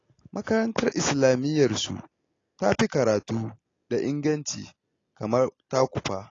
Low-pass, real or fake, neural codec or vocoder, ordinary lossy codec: 7.2 kHz; real; none; AAC, 32 kbps